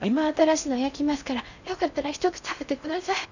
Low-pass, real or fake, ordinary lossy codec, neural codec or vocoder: 7.2 kHz; fake; none; codec, 16 kHz in and 24 kHz out, 0.6 kbps, FocalCodec, streaming, 4096 codes